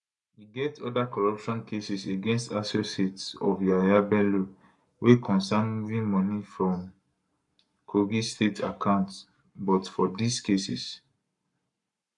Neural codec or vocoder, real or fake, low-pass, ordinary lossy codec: codec, 44.1 kHz, 7.8 kbps, Pupu-Codec; fake; 10.8 kHz; none